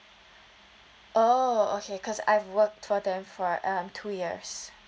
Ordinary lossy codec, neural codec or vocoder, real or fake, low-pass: none; none; real; none